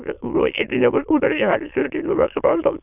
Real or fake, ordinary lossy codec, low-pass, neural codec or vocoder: fake; AAC, 32 kbps; 3.6 kHz; autoencoder, 22.05 kHz, a latent of 192 numbers a frame, VITS, trained on many speakers